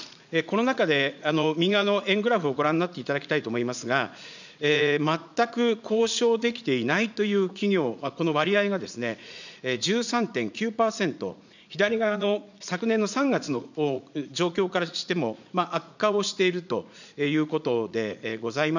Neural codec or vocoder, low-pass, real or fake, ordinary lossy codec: vocoder, 44.1 kHz, 80 mel bands, Vocos; 7.2 kHz; fake; none